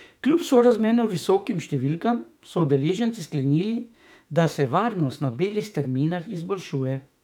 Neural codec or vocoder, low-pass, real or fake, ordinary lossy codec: autoencoder, 48 kHz, 32 numbers a frame, DAC-VAE, trained on Japanese speech; 19.8 kHz; fake; none